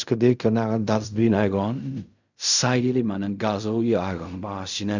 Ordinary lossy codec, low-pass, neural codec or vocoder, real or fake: none; 7.2 kHz; codec, 16 kHz in and 24 kHz out, 0.4 kbps, LongCat-Audio-Codec, fine tuned four codebook decoder; fake